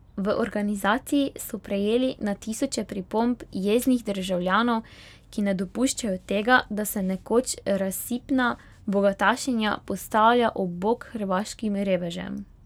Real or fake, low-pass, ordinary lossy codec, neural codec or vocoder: real; 19.8 kHz; none; none